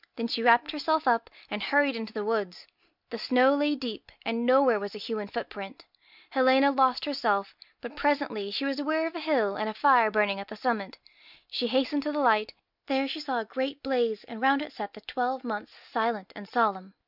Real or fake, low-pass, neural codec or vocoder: real; 5.4 kHz; none